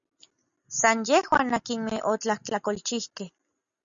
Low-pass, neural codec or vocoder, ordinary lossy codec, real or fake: 7.2 kHz; none; MP3, 96 kbps; real